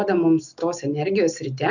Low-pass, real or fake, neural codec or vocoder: 7.2 kHz; real; none